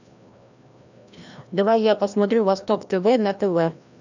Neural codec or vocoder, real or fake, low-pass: codec, 16 kHz, 1 kbps, FreqCodec, larger model; fake; 7.2 kHz